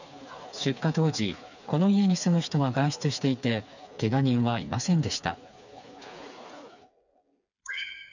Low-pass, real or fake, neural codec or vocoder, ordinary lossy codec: 7.2 kHz; fake; codec, 16 kHz, 4 kbps, FreqCodec, smaller model; none